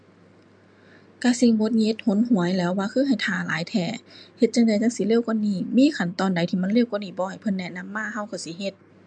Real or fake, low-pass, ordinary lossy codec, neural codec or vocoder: real; 9.9 kHz; MP3, 64 kbps; none